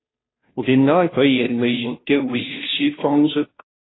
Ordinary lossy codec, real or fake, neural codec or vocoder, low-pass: AAC, 16 kbps; fake; codec, 16 kHz, 0.5 kbps, FunCodec, trained on Chinese and English, 25 frames a second; 7.2 kHz